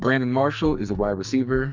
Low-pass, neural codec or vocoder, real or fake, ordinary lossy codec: 7.2 kHz; codec, 44.1 kHz, 2.6 kbps, SNAC; fake; MP3, 64 kbps